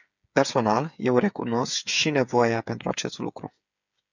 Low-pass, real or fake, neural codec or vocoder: 7.2 kHz; fake; codec, 16 kHz, 8 kbps, FreqCodec, smaller model